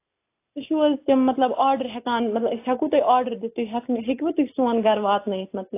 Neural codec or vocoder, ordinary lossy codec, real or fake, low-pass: none; AAC, 24 kbps; real; 3.6 kHz